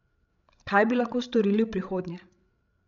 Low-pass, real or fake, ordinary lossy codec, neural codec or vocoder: 7.2 kHz; fake; none; codec, 16 kHz, 16 kbps, FreqCodec, larger model